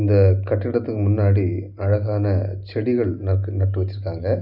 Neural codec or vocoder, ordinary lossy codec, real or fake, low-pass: none; none; real; 5.4 kHz